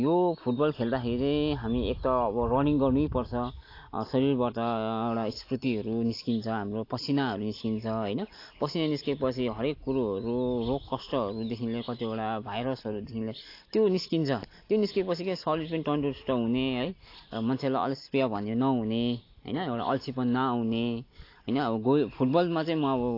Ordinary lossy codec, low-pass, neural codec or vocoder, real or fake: AAC, 32 kbps; 5.4 kHz; none; real